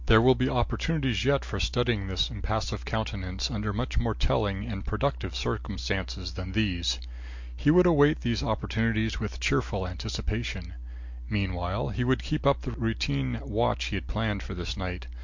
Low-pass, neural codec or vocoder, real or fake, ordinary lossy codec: 7.2 kHz; none; real; MP3, 48 kbps